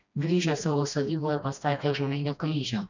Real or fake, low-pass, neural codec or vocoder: fake; 7.2 kHz; codec, 16 kHz, 1 kbps, FreqCodec, smaller model